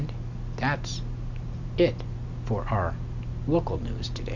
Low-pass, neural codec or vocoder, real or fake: 7.2 kHz; none; real